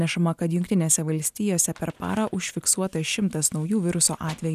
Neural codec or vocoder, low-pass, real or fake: none; 14.4 kHz; real